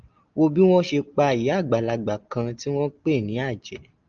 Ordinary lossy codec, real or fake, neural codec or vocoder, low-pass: Opus, 24 kbps; real; none; 7.2 kHz